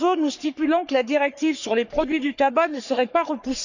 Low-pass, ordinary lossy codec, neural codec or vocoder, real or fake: 7.2 kHz; none; codec, 44.1 kHz, 3.4 kbps, Pupu-Codec; fake